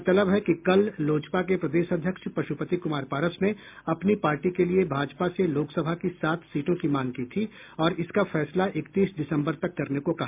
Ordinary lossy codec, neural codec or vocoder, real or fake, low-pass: MP3, 32 kbps; none; real; 3.6 kHz